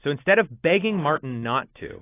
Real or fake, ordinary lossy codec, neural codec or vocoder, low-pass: real; AAC, 16 kbps; none; 3.6 kHz